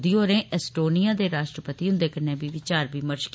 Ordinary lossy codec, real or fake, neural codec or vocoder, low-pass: none; real; none; none